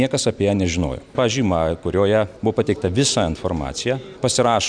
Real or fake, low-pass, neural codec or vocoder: real; 9.9 kHz; none